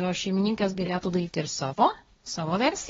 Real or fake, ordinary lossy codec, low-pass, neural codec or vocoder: fake; AAC, 24 kbps; 7.2 kHz; codec, 16 kHz, 1.1 kbps, Voila-Tokenizer